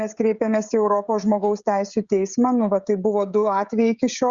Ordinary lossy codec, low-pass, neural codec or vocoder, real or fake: Opus, 64 kbps; 7.2 kHz; codec, 16 kHz, 16 kbps, FreqCodec, smaller model; fake